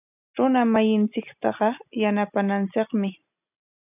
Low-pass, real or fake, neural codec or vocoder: 3.6 kHz; real; none